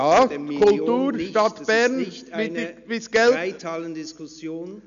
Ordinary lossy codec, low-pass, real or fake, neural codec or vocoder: none; 7.2 kHz; real; none